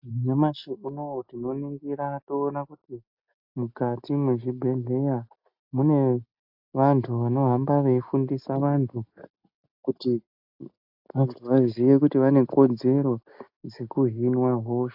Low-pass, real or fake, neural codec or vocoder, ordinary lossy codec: 5.4 kHz; real; none; AAC, 48 kbps